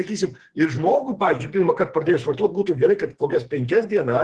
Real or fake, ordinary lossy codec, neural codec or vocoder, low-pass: fake; Opus, 16 kbps; codec, 44.1 kHz, 2.6 kbps, SNAC; 10.8 kHz